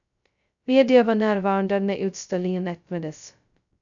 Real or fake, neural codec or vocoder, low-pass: fake; codec, 16 kHz, 0.2 kbps, FocalCodec; 7.2 kHz